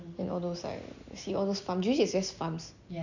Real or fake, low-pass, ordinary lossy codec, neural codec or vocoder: real; 7.2 kHz; none; none